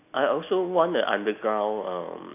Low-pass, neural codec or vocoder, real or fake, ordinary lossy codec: 3.6 kHz; none; real; none